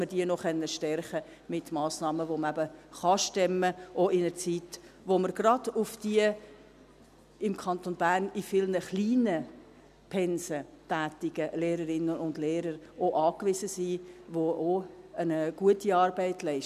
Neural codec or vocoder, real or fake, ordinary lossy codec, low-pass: none; real; none; 14.4 kHz